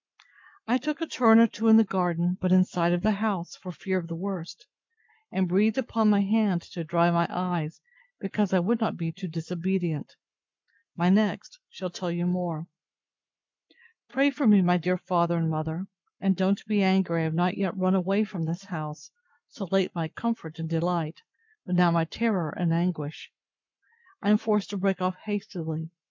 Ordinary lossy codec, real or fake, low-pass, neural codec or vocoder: MP3, 64 kbps; fake; 7.2 kHz; codec, 44.1 kHz, 7.8 kbps, Pupu-Codec